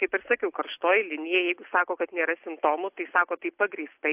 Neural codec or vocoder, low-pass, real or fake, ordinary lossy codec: none; 3.6 kHz; real; AAC, 32 kbps